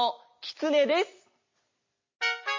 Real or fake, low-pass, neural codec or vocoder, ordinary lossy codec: real; 7.2 kHz; none; MP3, 32 kbps